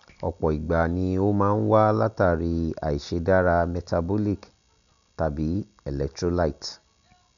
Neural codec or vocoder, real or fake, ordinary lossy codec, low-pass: none; real; none; 7.2 kHz